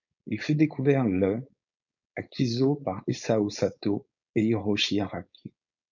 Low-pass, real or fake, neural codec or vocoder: 7.2 kHz; fake; codec, 16 kHz, 4.8 kbps, FACodec